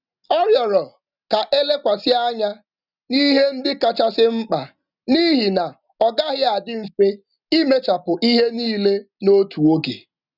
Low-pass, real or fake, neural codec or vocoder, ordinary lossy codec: 5.4 kHz; real; none; none